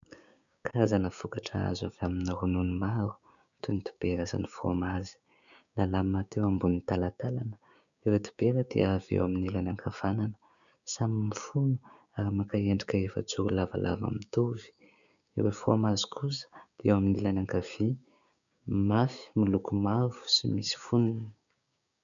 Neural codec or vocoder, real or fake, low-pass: codec, 16 kHz, 6 kbps, DAC; fake; 7.2 kHz